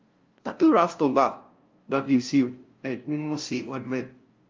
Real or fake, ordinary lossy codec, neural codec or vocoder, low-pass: fake; Opus, 32 kbps; codec, 16 kHz, 0.5 kbps, FunCodec, trained on LibriTTS, 25 frames a second; 7.2 kHz